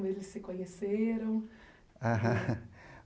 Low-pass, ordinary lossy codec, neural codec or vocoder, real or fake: none; none; none; real